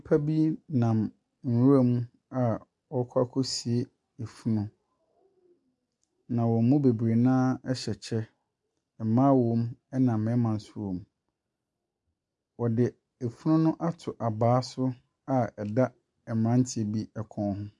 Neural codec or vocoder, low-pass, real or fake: none; 10.8 kHz; real